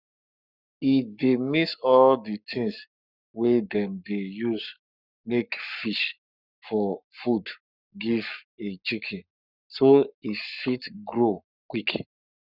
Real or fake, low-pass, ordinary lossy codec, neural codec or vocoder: fake; 5.4 kHz; none; codec, 44.1 kHz, 7.8 kbps, Pupu-Codec